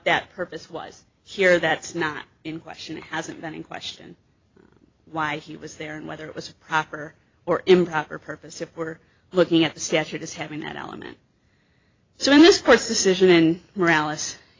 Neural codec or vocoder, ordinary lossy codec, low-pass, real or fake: vocoder, 44.1 kHz, 128 mel bands every 256 samples, BigVGAN v2; AAC, 32 kbps; 7.2 kHz; fake